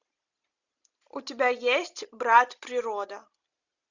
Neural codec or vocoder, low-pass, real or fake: none; 7.2 kHz; real